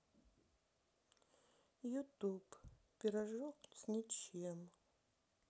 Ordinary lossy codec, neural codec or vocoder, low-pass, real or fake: none; none; none; real